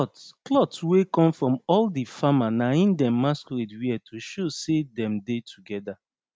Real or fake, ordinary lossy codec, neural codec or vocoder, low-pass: real; none; none; none